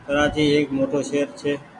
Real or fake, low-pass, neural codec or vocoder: real; 10.8 kHz; none